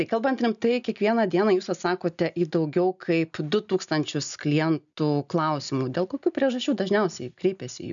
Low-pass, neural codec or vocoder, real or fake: 7.2 kHz; none; real